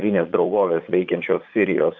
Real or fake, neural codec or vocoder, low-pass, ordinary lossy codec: fake; vocoder, 44.1 kHz, 80 mel bands, Vocos; 7.2 kHz; Opus, 64 kbps